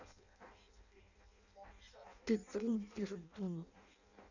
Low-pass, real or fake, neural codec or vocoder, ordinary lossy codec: 7.2 kHz; fake; codec, 16 kHz in and 24 kHz out, 0.6 kbps, FireRedTTS-2 codec; none